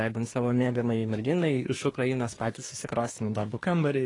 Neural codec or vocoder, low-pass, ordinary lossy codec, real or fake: codec, 24 kHz, 1 kbps, SNAC; 10.8 kHz; AAC, 32 kbps; fake